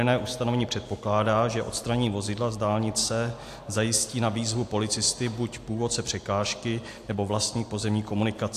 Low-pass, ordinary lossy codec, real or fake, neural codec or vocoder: 14.4 kHz; AAC, 64 kbps; real; none